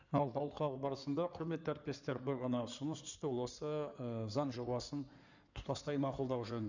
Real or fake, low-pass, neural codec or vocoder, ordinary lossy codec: fake; 7.2 kHz; codec, 16 kHz in and 24 kHz out, 2.2 kbps, FireRedTTS-2 codec; none